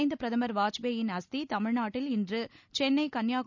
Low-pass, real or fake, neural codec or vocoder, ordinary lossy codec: 7.2 kHz; real; none; none